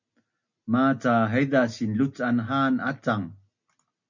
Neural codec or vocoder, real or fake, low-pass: none; real; 7.2 kHz